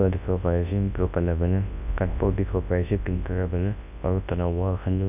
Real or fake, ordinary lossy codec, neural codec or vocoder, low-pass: fake; none; codec, 24 kHz, 0.9 kbps, WavTokenizer, large speech release; 3.6 kHz